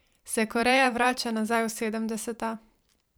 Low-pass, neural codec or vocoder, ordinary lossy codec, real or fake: none; vocoder, 44.1 kHz, 128 mel bands every 512 samples, BigVGAN v2; none; fake